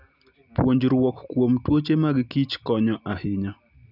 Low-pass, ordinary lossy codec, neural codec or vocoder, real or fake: 5.4 kHz; none; none; real